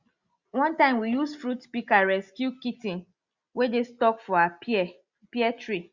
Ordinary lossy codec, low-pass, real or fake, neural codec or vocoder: Opus, 64 kbps; 7.2 kHz; real; none